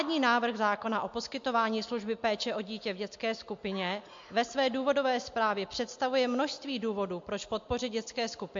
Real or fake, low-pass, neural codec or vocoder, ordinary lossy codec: real; 7.2 kHz; none; MP3, 48 kbps